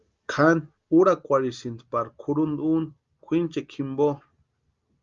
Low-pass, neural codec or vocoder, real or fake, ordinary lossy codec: 7.2 kHz; none; real; Opus, 32 kbps